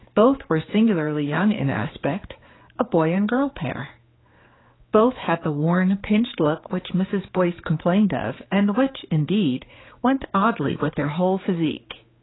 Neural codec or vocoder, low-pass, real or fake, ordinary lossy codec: codec, 16 kHz, 4 kbps, X-Codec, HuBERT features, trained on general audio; 7.2 kHz; fake; AAC, 16 kbps